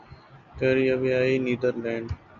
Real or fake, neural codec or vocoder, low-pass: real; none; 7.2 kHz